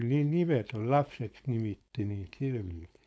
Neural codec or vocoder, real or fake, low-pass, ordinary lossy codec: codec, 16 kHz, 4.8 kbps, FACodec; fake; none; none